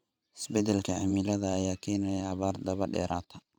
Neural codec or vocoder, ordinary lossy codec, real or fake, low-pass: vocoder, 44.1 kHz, 128 mel bands every 512 samples, BigVGAN v2; none; fake; 19.8 kHz